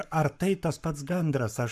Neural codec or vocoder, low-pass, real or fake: codec, 44.1 kHz, 7.8 kbps, Pupu-Codec; 14.4 kHz; fake